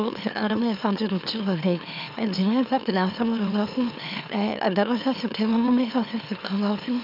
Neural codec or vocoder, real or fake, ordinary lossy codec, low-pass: autoencoder, 44.1 kHz, a latent of 192 numbers a frame, MeloTTS; fake; none; 5.4 kHz